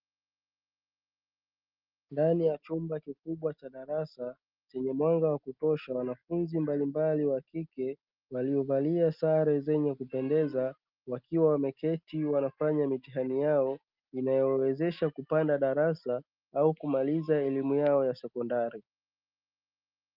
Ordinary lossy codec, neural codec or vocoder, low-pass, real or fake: Opus, 32 kbps; none; 5.4 kHz; real